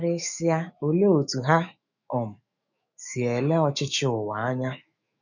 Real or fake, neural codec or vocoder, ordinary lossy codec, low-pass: fake; autoencoder, 48 kHz, 128 numbers a frame, DAC-VAE, trained on Japanese speech; none; 7.2 kHz